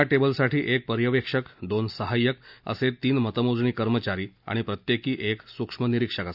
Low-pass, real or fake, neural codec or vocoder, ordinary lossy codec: 5.4 kHz; real; none; none